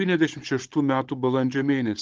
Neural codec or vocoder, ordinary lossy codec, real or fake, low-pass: codec, 16 kHz, 16 kbps, FunCodec, trained on Chinese and English, 50 frames a second; Opus, 16 kbps; fake; 7.2 kHz